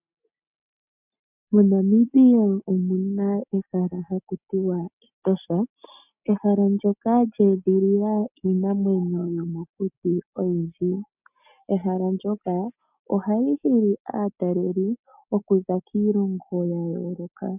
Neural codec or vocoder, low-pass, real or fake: none; 3.6 kHz; real